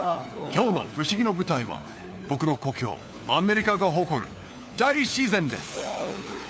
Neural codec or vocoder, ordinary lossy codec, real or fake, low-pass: codec, 16 kHz, 4 kbps, FunCodec, trained on LibriTTS, 50 frames a second; none; fake; none